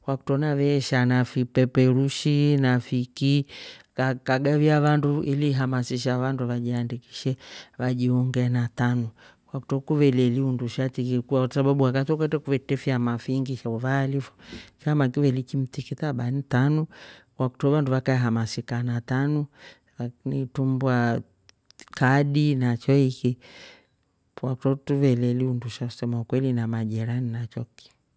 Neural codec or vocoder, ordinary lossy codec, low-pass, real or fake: none; none; none; real